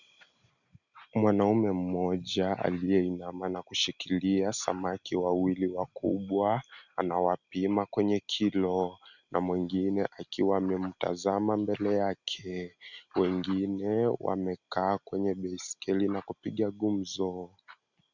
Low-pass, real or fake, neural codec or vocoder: 7.2 kHz; real; none